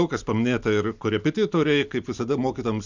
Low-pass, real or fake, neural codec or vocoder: 7.2 kHz; real; none